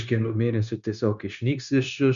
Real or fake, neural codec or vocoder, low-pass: fake; codec, 16 kHz, 0.9 kbps, LongCat-Audio-Codec; 7.2 kHz